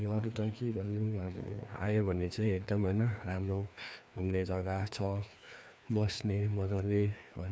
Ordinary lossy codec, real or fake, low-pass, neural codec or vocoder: none; fake; none; codec, 16 kHz, 2 kbps, FreqCodec, larger model